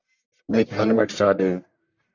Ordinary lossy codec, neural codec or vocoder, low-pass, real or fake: AAC, 48 kbps; codec, 44.1 kHz, 1.7 kbps, Pupu-Codec; 7.2 kHz; fake